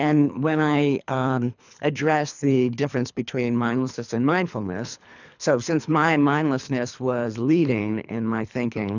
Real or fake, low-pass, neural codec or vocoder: fake; 7.2 kHz; codec, 24 kHz, 3 kbps, HILCodec